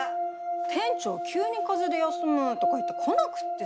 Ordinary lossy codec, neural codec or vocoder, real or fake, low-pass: none; none; real; none